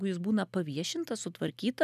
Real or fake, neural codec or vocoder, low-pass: real; none; 14.4 kHz